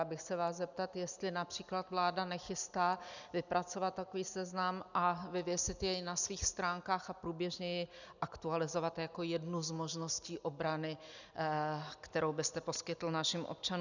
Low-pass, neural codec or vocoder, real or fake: 7.2 kHz; none; real